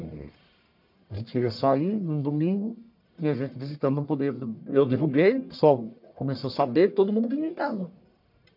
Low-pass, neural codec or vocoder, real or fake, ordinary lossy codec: 5.4 kHz; codec, 44.1 kHz, 1.7 kbps, Pupu-Codec; fake; MP3, 48 kbps